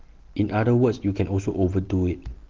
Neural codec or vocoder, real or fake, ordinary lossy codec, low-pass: none; real; Opus, 16 kbps; 7.2 kHz